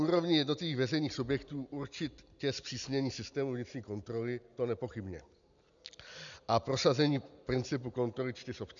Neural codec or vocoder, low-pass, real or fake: none; 7.2 kHz; real